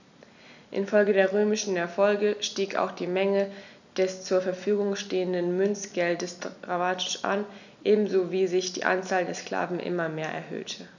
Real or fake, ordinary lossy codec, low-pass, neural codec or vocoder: real; none; 7.2 kHz; none